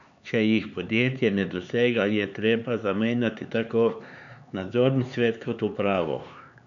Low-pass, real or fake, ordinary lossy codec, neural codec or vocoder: 7.2 kHz; fake; none; codec, 16 kHz, 4 kbps, X-Codec, HuBERT features, trained on LibriSpeech